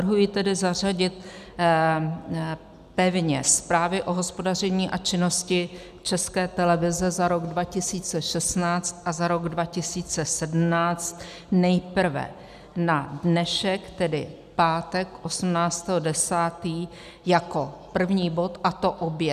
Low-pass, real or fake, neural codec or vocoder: 14.4 kHz; real; none